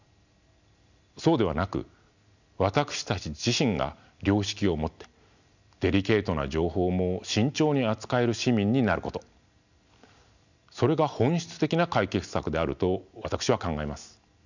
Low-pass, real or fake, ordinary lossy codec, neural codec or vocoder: 7.2 kHz; real; none; none